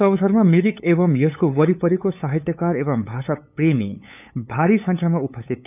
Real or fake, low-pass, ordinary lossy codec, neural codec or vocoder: fake; 3.6 kHz; none; codec, 16 kHz, 8 kbps, FunCodec, trained on LibriTTS, 25 frames a second